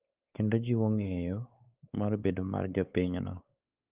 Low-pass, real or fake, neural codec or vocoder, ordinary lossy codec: 3.6 kHz; fake; codec, 16 kHz, 4 kbps, X-Codec, WavLM features, trained on Multilingual LibriSpeech; Opus, 32 kbps